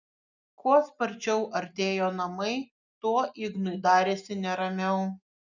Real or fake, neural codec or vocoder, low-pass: real; none; 7.2 kHz